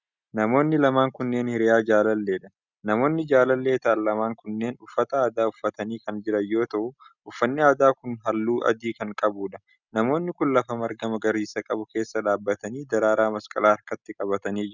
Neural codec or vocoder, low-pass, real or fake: none; 7.2 kHz; real